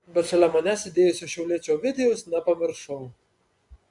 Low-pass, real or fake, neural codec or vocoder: 10.8 kHz; fake; vocoder, 24 kHz, 100 mel bands, Vocos